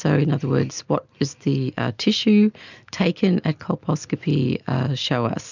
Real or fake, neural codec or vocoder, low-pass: real; none; 7.2 kHz